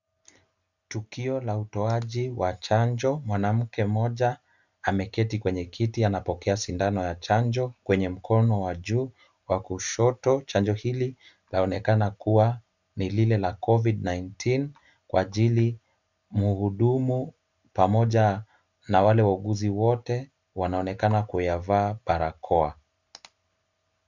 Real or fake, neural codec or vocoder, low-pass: real; none; 7.2 kHz